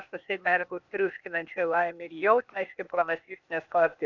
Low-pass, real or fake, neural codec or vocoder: 7.2 kHz; fake; codec, 16 kHz, 0.8 kbps, ZipCodec